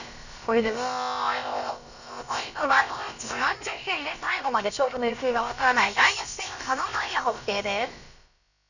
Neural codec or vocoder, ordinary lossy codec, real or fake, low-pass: codec, 16 kHz, about 1 kbps, DyCAST, with the encoder's durations; none; fake; 7.2 kHz